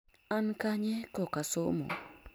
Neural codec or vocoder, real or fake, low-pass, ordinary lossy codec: none; real; none; none